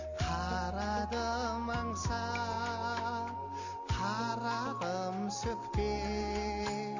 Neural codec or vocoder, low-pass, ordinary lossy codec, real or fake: none; 7.2 kHz; Opus, 64 kbps; real